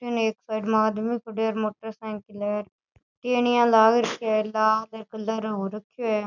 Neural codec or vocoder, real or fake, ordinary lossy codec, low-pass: none; real; none; 7.2 kHz